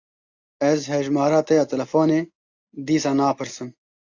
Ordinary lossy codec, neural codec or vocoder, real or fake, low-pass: AAC, 48 kbps; none; real; 7.2 kHz